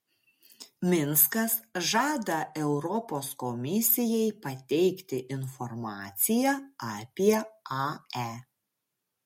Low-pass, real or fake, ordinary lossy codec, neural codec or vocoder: 19.8 kHz; real; MP3, 64 kbps; none